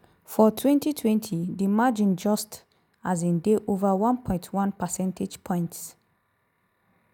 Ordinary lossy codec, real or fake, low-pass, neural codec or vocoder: none; real; none; none